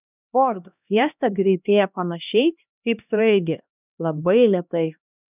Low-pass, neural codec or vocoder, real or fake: 3.6 kHz; codec, 16 kHz, 1 kbps, X-Codec, HuBERT features, trained on LibriSpeech; fake